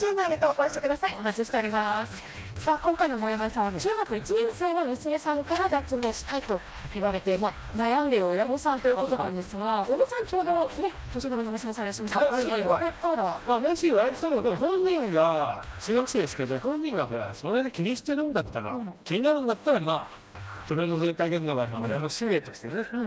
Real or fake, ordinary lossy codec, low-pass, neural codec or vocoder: fake; none; none; codec, 16 kHz, 1 kbps, FreqCodec, smaller model